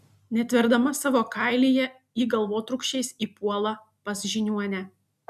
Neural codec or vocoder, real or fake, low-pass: none; real; 14.4 kHz